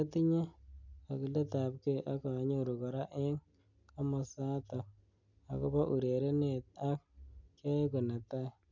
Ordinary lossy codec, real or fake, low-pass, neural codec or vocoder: none; real; 7.2 kHz; none